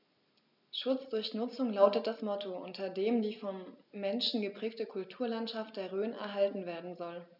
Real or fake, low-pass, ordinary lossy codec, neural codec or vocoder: real; 5.4 kHz; none; none